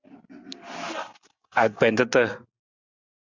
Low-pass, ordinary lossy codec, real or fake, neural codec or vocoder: 7.2 kHz; Opus, 64 kbps; real; none